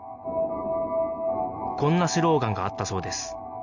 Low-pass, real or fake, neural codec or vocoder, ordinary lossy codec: 7.2 kHz; real; none; none